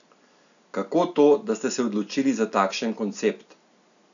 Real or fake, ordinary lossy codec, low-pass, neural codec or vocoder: real; none; 7.2 kHz; none